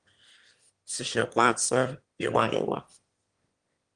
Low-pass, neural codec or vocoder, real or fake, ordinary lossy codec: 9.9 kHz; autoencoder, 22.05 kHz, a latent of 192 numbers a frame, VITS, trained on one speaker; fake; Opus, 24 kbps